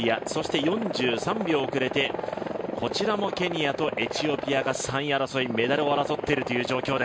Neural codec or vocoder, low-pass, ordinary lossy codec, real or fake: none; none; none; real